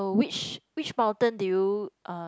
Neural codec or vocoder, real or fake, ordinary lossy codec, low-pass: none; real; none; none